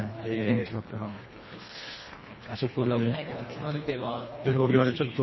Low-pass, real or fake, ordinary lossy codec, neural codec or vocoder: 7.2 kHz; fake; MP3, 24 kbps; codec, 24 kHz, 1.5 kbps, HILCodec